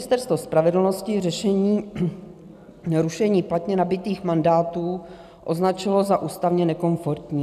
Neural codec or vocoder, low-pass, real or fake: none; 14.4 kHz; real